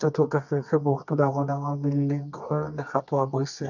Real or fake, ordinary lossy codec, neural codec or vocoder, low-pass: fake; none; codec, 24 kHz, 0.9 kbps, WavTokenizer, medium music audio release; 7.2 kHz